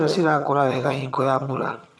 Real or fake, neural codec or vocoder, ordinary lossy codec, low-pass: fake; vocoder, 22.05 kHz, 80 mel bands, HiFi-GAN; none; none